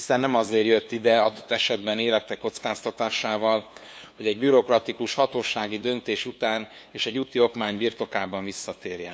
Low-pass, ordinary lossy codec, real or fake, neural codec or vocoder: none; none; fake; codec, 16 kHz, 2 kbps, FunCodec, trained on LibriTTS, 25 frames a second